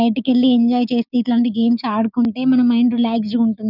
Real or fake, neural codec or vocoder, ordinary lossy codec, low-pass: fake; codec, 16 kHz, 6 kbps, DAC; none; 5.4 kHz